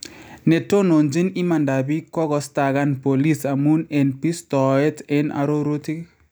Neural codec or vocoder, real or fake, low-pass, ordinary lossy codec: none; real; none; none